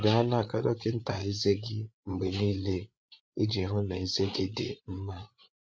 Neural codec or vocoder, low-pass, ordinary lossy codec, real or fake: codec, 16 kHz, 8 kbps, FreqCodec, larger model; none; none; fake